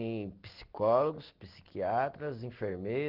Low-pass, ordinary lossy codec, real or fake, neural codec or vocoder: 5.4 kHz; Opus, 16 kbps; real; none